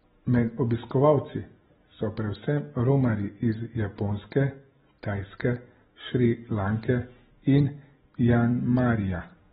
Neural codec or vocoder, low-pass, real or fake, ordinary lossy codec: none; 19.8 kHz; real; AAC, 16 kbps